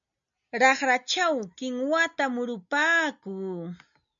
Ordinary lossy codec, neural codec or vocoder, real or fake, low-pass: MP3, 96 kbps; none; real; 7.2 kHz